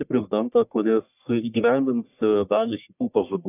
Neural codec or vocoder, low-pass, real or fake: codec, 44.1 kHz, 1.7 kbps, Pupu-Codec; 3.6 kHz; fake